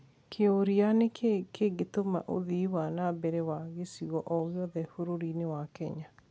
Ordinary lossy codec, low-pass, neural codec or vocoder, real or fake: none; none; none; real